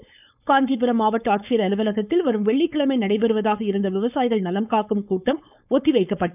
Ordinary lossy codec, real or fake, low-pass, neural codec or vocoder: none; fake; 3.6 kHz; codec, 16 kHz, 4.8 kbps, FACodec